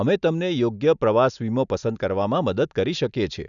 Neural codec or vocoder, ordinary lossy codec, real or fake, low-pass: none; none; real; 7.2 kHz